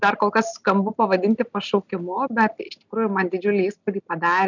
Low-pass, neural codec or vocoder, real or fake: 7.2 kHz; none; real